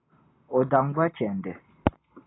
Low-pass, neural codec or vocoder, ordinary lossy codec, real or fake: 7.2 kHz; none; AAC, 16 kbps; real